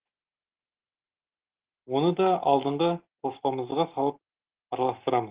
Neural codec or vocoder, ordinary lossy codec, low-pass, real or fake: none; Opus, 16 kbps; 3.6 kHz; real